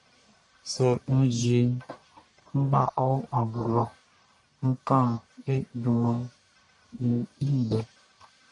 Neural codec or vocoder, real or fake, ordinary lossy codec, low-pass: codec, 44.1 kHz, 1.7 kbps, Pupu-Codec; fake; MP3, 96 kbps; 10.8 kHz